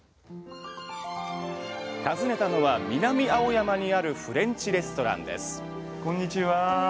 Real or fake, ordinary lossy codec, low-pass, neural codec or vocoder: real; none; none; none